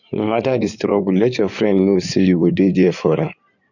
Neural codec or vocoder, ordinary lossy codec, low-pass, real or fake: codec, 16 kHz in and 24 kHz out, 2.2 kbps, FireRedTTS-2 codec; none; 7.2 kHz; fake